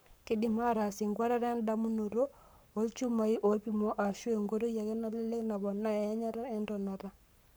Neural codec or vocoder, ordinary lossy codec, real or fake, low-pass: codec, 44.1 kHz, 7.8 kbps, Pupu-Codec; none; fake; none